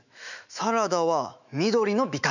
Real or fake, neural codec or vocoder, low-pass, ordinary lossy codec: fake; autoencoder, 48 kHz, 128 numbers a frame, DAC-VAE, trained on Japanese speech; 7.2 kHz; none